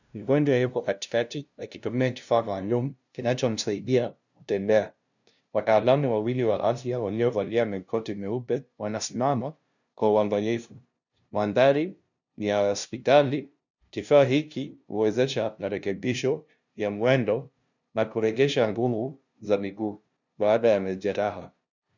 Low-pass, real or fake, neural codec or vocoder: 7.2 kHz; fake; codec, 16 kHz, 0.5 kbps, FunCodec, trained on LibriTTS, 25 frames a second